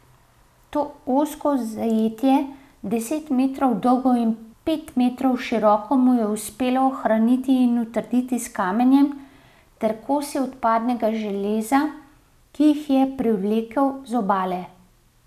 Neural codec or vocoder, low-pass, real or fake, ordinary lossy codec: none; 14.4 kHz; real; none